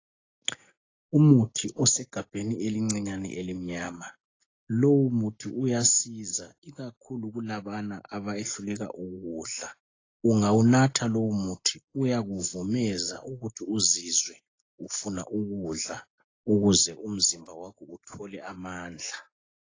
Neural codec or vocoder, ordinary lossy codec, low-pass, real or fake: none; AAC, 32 kbps; 7.2 kHz; real